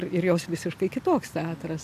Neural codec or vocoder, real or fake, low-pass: none; real; 14.4 kHz